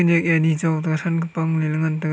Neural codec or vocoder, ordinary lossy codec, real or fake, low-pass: none; none; real; none